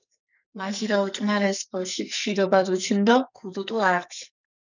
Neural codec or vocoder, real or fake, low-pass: codec, 44.1 kHz, 2.6 kbps, SNAC; fake; 7.2 kHz